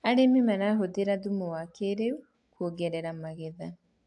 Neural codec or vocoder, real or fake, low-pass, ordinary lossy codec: none; real; 10.8 kHz; AAC, 64 kbps